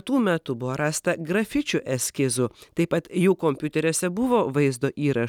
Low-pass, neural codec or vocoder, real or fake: 19.8 kHz; none; real